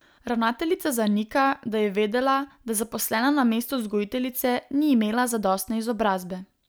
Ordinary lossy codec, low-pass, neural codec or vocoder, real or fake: none; none; none; real